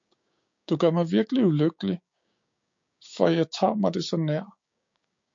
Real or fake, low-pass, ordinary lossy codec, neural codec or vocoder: fake; 7.2 kHz; MP3, 48 kbps; codec, 16 kHz, 6 kbps, DAC